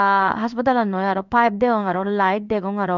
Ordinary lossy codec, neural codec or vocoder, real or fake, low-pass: none; codec, 16 kHz in and 24 kHz out, 1 kbps, XY-Tokenizer; fake; 7.2 kHz